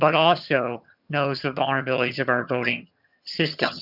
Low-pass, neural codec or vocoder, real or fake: 5.4 kHz; vocoder, 22.05 kHz, 80 mel bands, HiFi-GAN; fake